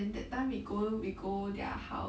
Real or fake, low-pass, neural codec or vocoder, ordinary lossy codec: real; none; none; none